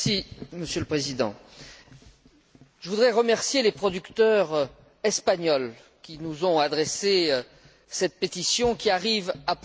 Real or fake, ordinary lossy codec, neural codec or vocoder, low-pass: real; none; none; none